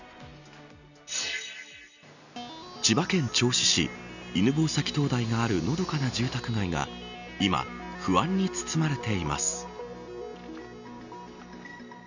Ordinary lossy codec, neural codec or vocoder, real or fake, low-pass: none; none; real; 7.2 kHz